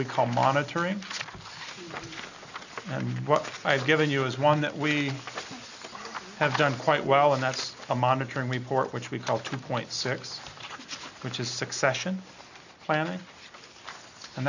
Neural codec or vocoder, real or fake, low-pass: none; real; 7.2 kHz